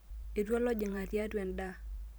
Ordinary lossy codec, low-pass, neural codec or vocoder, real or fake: none; none; vocoder, 44.1 kHz, 128 mel bands every 512 samples, BigVGAN v2; fake